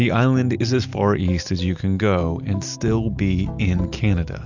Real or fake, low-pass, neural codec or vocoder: real; 7.2 kHz; none